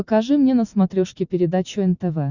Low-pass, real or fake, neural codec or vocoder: 7.2 kHz; real; none